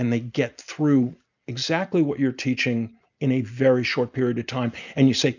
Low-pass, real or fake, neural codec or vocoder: 7.2 kHz; real; none